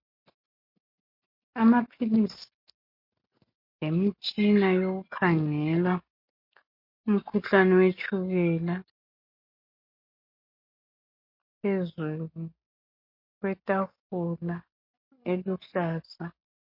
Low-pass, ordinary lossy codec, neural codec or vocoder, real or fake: 5.4 kHz; MP3, 32 kbps; none; real